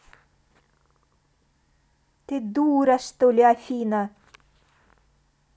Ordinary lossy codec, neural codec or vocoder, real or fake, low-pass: none; none; real; none